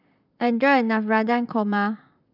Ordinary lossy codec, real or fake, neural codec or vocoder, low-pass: none; fake; vocoder, 44.1 kHz, 128 mel bands, Pupu-Vocoder; 5.4 kHz